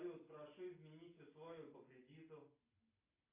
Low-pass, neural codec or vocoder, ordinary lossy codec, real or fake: 3.6 kHz; none; AAC, 24 kbps; real